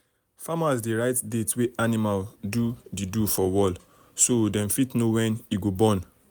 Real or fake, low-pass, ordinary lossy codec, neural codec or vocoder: real; none; none; none